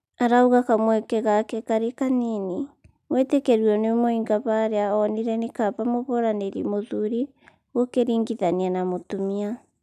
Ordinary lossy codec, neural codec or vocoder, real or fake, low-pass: none; none; real; 14.4 kHz